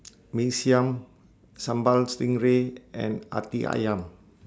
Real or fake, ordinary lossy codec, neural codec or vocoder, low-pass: real; none; none; none